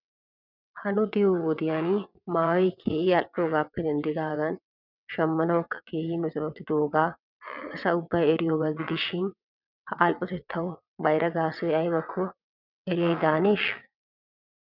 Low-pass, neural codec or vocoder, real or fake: 5.4 kHz; vocoder, 22.05 kHz, 80 mel bands, WaveNeXt; fake